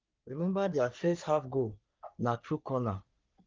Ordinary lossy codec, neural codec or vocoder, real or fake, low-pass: Opus, 24 kbps; codec, 44.1 kHz, 3.4 kbps, Pupu-Codec; fake; 7.2 kHz